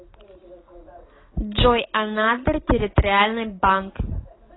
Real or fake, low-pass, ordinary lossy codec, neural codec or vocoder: real; 7.2 kHz; AAC, 16 kbps; none